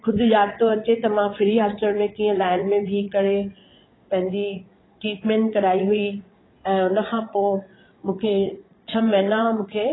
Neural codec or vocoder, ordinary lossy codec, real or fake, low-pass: vocoder, 22.05 kHz, 80 mel bands, Vocos; AAC, 16 kbps; fake; 7.2 kHz